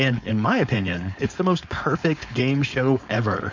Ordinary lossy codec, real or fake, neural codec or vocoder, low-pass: MP3, 48 kbps; fake; codec, 16 kHz, 4.8 kbps, FACodec; 7.2 kHz